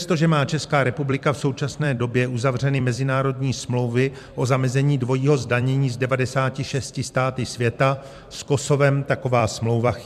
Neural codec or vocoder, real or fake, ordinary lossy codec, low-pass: vocoder, 44.1 kHz, 128 mel bands every 256 samples, BigVGAN v2; fake; MP3, 96 kbps; 14.4 kHz